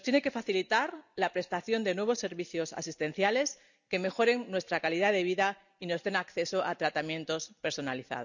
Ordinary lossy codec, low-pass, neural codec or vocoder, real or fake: none; 7.2 kHz; none; real